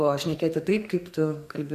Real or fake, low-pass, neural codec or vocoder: fake; 14.4 kHz; codec, 44.1 kHz, 2.6 kbps, SNAC